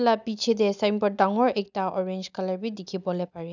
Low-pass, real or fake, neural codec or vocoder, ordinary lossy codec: 7.2 kHz; real; none; none